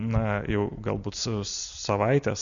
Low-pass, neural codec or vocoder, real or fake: 7.2 kHz; none; real